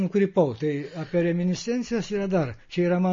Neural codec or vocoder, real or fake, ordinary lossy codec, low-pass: none; real; MP3, 32 kbps; 7.2 kHz